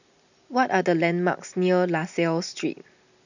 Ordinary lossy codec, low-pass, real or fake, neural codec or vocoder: none; 7.2 kHz; real; none